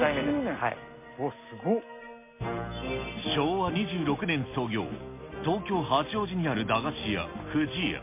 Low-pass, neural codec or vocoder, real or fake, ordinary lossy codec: 3.6 kHz; none; real; MP3, 24 kbps